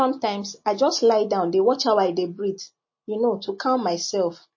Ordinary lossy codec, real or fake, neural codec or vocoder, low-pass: MP3, 32 kbps; real; none; 7.2 kHz